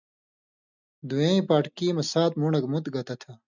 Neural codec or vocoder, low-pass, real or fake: none; 7.2 kHz; real